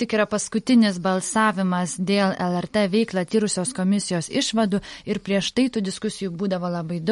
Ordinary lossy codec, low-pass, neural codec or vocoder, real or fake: MP3, 48 kbps; 19.8 kHz; none; real